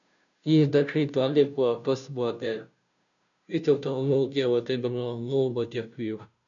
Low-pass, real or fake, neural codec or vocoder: 7.2 kHz; fake; codec, 16 kHz, 0.5 kbps, FunCodec, trained on Chinese and English, 25 frames a second